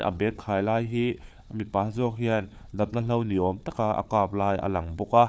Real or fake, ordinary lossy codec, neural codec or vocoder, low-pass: fake; none; codec, 16 kHz, 4 kbps, FunCodec, trained on Chinese and English, 50 frames a second; none